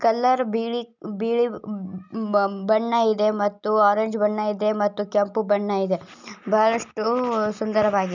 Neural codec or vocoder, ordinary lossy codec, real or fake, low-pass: none; none; real; 7.2 kHz